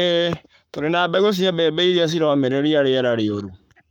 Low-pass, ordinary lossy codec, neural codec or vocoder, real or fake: 19.8 kHz; none; codec, 44.1 kHz, 7.8 kbps, Pupu-Codec; fake